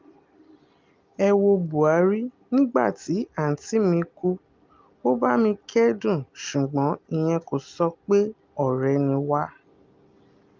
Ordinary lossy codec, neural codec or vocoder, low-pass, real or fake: Opus, 24 kbps; none; 7.2 kHz; real